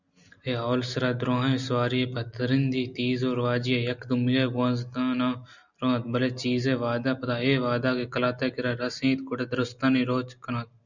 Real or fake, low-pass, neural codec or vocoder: real; 7.2 kHz; none